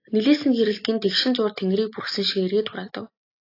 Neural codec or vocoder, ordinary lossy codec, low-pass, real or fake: none; AAC, 32 kbps; 5.4 kHz; real